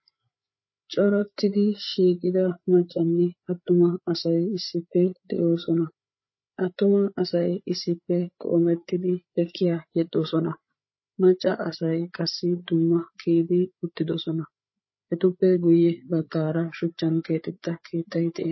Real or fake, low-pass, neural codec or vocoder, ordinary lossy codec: fake; 7.2 kHz; codec, 16 kHz, 8 kbps, FreqCodec, larger model; MP3, 24 kbps